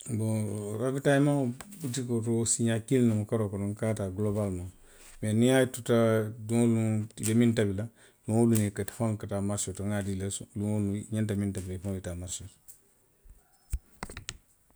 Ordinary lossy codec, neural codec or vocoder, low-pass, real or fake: none; none; none; real